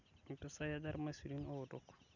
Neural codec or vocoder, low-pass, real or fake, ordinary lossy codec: none; 7.2 kHz; real; none